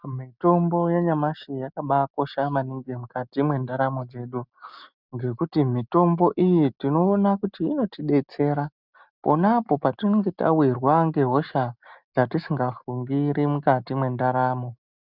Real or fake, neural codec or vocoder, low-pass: real; none; 5.4 kHz